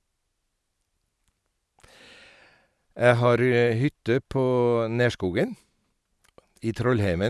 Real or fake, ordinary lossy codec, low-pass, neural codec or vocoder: real; none; none; none